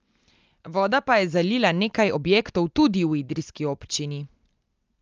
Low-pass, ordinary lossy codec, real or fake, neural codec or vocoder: 7.2 kHz; Opus, 24 kbps; real; none